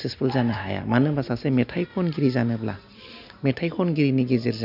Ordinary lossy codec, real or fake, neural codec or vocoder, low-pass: none; real; none; 5.4 kHz